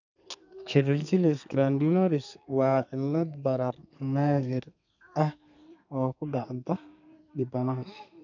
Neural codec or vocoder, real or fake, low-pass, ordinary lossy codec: codec, 32 kHz, 1.9 kbps, SNAC; fake; 7.2 kHz; none